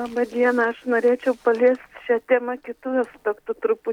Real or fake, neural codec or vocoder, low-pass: real; none; 19.8 kHz